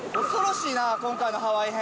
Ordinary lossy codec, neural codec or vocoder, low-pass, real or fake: none; none; none; real